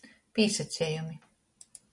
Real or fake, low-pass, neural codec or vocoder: real; 10.8 kHz; none